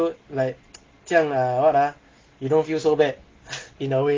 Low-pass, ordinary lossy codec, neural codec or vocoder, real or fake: 7.2 kHz; Opus, 16 kbps; none; real